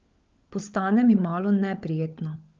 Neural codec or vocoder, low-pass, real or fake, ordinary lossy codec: codec, 16 kHz, 16 kbps, FunCodec, trained on LibriTTS, 50 frames a second; 7.2 kHz; fake; Opus, 24 kbps